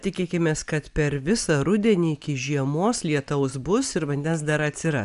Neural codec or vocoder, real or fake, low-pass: none; real; 10.8 kHz